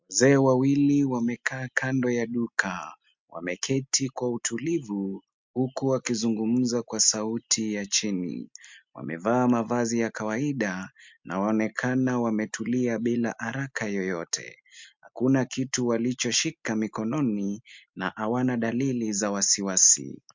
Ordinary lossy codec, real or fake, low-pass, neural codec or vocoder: MP3, 64 kbps; real; 7.2 kHz; none